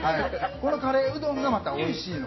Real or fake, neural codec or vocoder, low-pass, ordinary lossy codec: real; none; 7.2 kHz; MP3, 24 kbps